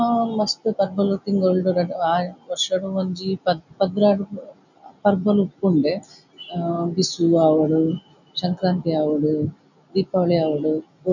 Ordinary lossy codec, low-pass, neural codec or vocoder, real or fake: none; 7.2 kHz; none; real